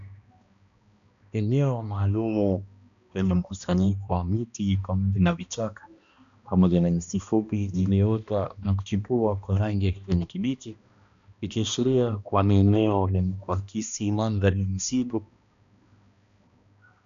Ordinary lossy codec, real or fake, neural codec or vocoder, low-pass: MP3, 96 kbps; fake; codec, 16 kHz, 1 kbps, X-Codec, HuBERT features, trained on balanced general audio; 7.2 kHz